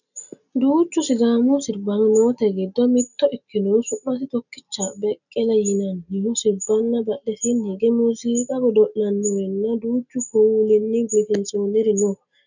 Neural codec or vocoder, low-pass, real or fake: none; 7.2 kHz; real